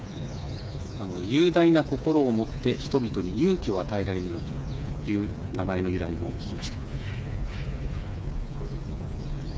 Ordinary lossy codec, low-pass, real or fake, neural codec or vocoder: none; none; fake; codec, 16 kHz, 4 kbps, FreqCodec, smaller model